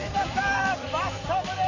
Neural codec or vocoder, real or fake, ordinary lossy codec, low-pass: vocoder, 44.1 kHz, 128 mel bands every 512 samples, BigVGAN v2; fake; AAC, 48 kbps; 7.2 kHz